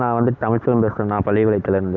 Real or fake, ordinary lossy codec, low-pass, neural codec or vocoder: real; none; 7.2 kHz; none